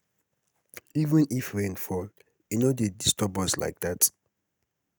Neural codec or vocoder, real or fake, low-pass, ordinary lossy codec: none; real; none; none